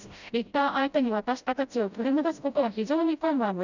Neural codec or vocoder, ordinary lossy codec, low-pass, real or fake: codec, 16 kHz, 0.5 kbps, FreqCodec, smaller model; none; 7.2 kHz; fake